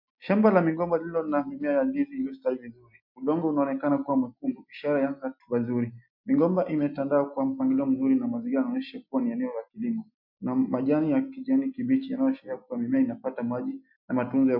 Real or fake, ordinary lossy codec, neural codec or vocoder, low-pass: real; MP3, 48 kbps; none; 5.4 kHz